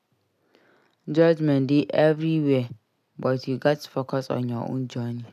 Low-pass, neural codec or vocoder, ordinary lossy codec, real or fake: 14.4 kHz; none; none; real